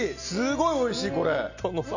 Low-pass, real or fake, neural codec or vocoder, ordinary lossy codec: 7.2 kHz; real; none; none